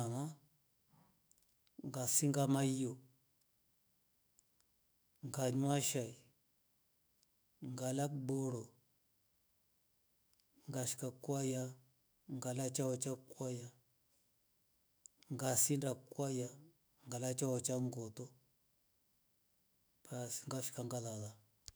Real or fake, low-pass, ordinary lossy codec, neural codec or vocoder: fake; none; none; autoencoder, 48 kHz, 128 numbers a frame, DAC-VAE, trained on Japanese speech